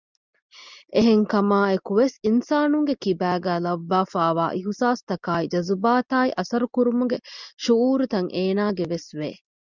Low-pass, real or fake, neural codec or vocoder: 7.2 kHz; real; none